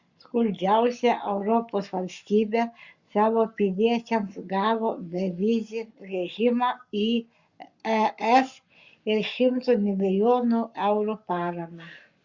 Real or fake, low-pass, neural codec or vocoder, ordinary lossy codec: fake; 7.2 kHz; codec, 44.1 kHz, 7.8 kbps, Pupu-Codec; Opus, 64 kbps